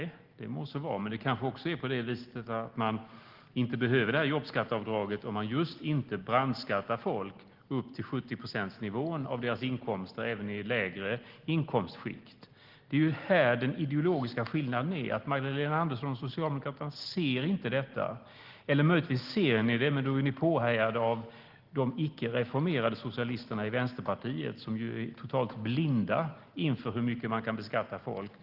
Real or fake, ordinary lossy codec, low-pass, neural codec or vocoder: real; Opus, 16 kbps; 5.4 kHz; none